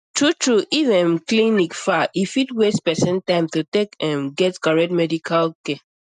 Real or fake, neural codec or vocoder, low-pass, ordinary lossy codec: real; none; 9.9 kHz; none